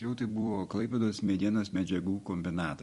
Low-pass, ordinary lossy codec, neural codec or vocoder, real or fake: 14.4 kHz; MP3, 48 kbps; vocoder, 44.1 kHz, 128 mel bands every 256 samples, BigVGAN v2; fake